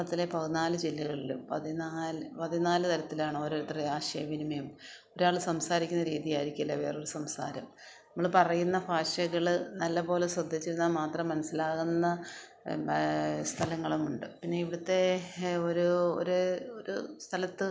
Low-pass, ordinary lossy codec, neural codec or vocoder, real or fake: none; none; none; real